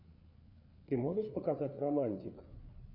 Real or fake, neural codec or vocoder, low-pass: fake; codec, 16 kHz, 4 kbps, FreqCodec, larger model; 5.4 kHz